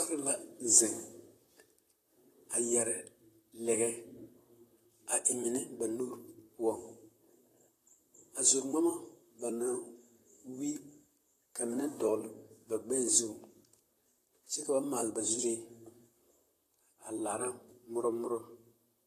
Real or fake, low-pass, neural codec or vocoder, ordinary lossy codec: fake; 14.4 kHz; vocoder, 44.1 kHz, 128 mel bands, Pupu-Vocoder; AAC, 48 kbps